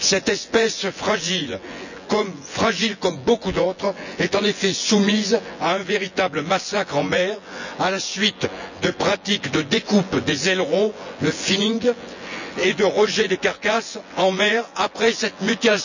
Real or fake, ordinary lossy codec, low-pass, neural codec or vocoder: fake; none; 7.2 kHz; vocoder, 24 kHz, 100 mel bands, Vocos